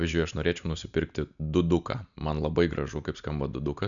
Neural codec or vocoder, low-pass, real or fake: none; 7.2 kHz; real